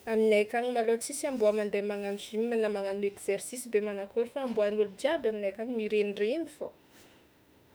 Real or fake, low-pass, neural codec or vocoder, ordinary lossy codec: fake; none; autoencoder, 48 kHz, 32 numbers a frame, DAC-VAE, trained on Japanese speech; none